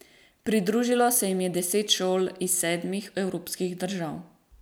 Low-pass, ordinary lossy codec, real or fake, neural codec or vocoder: none; none; real; none